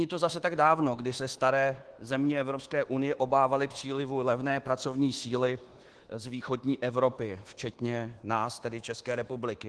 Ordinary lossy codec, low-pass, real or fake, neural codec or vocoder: Opus, 16 kbps; 10.8 kHz; fake; codec, 24 kHz, 1.2 kbps, DualCodec